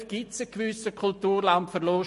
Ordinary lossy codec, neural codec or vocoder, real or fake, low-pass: MP3, 48 kbps; none; real; 14.4 kHz